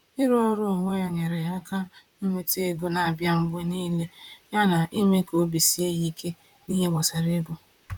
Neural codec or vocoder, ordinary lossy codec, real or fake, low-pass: vocoder, 44.1 kHz, 128 mel bands, Pupu-Vocoder; none; fake; 19.8 kHz